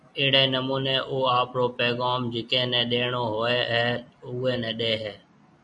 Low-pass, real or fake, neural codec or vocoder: 10.8 kHz; real; none